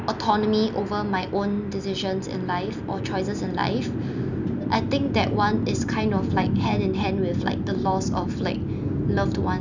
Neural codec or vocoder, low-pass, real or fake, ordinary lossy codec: none; 7.2 kHz; real; none